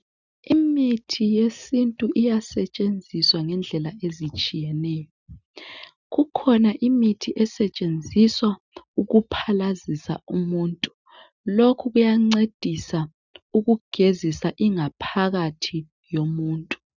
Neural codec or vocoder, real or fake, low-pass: none; real; 7.2 kHz